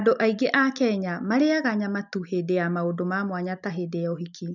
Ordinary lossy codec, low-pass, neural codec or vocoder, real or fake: none; 7.2 kHz; none; real